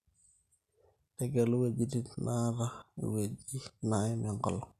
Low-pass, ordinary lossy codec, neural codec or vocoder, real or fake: 14.4 kHz; none; none; real